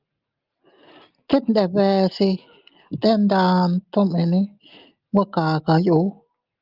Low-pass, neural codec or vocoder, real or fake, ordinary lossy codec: 5.4 kHz; none; real; Opus, 32 kbps